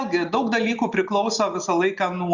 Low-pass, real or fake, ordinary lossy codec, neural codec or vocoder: 7.2 kHz; real; Opus, 64 kbps; none